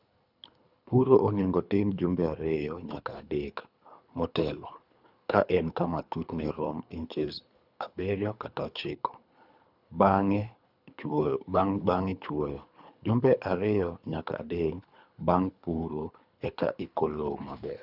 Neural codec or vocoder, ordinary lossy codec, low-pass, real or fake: codec, 24 kHz, 3 kbps, HILCodec; none; 5.4 kHz; fake